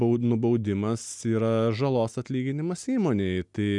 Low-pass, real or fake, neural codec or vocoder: 10.8 kHz; real; none